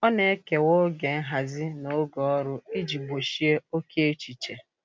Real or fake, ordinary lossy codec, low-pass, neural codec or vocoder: real; none; none; none